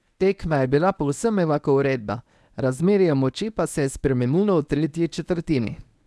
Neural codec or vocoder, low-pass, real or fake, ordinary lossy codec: codec, 24 kHz, 0.9 kbps, WavTokenizer, medium speech release version 1; none; fake; none